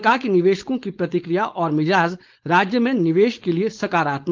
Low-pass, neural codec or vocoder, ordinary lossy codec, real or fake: 7.2 kHz; codec, 16 kHz, 4.8 kbps, FACodec; Opus, 32 kbps; fake